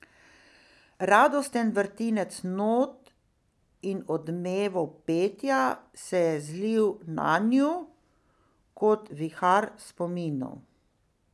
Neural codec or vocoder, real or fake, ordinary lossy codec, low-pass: none; real; none; none